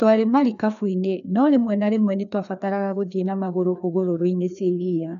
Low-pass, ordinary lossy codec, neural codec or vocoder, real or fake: 7.2 kHz; none; codec, 16 kHz, 2 kbps, FreqCodec, larger model; fake